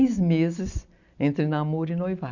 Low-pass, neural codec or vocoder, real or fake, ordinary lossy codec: 7.2 kHz; vocoder, 44.1 kHz, 128 mel bands every 256 samples, BigVGAN v2; fake; none